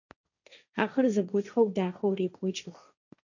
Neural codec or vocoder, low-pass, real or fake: codec, 16 kHz, 1.1 kbps, Voila-Tokenizer; 7.2 kHz; fake